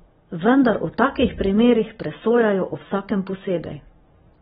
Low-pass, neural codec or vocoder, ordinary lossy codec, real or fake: 19.8 kHz; none; AAC, 16 kbps; real